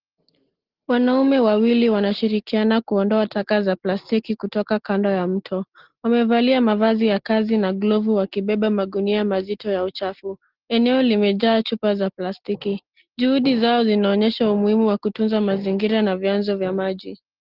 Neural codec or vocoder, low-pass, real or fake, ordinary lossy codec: none; 5.4 kHz; real; Opus, 16 kbps